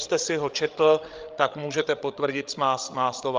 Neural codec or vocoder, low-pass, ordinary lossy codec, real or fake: codec, 16 kHz, 16 kbps, FreqCodec, larger model; 7.2 kHz; Opus, 24 kbps; fake